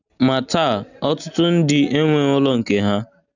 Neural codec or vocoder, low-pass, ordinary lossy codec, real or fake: none; 7.2 kHz; none; real